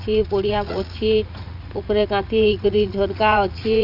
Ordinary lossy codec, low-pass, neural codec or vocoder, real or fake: none; 5.4 kHz; vocoder, 44.1 kHz, 80 mel bands, Vocos; fake